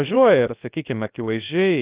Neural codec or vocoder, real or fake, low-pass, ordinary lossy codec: codec, 16 kHz, about 1 kbps, DyCAST, with the encoder's durations; fake; 3.6 kHz; Opus, 24 kbps